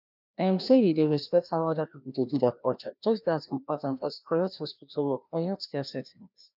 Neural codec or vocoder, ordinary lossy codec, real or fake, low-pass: codec, 16 kHz, 1 kbps, FreqCodec, larger model; none; fake; 5.4 kHz